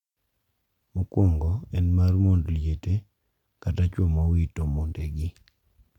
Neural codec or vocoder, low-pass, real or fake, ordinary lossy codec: none; 19.8 kHz; real; MP3, 96 kbps